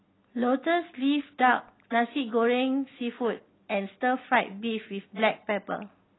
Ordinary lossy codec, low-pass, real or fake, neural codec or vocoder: AAC, 16 kbps; 7.2 kHz; real; none